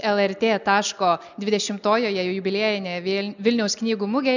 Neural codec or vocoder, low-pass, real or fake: none; 7.2 kHz; real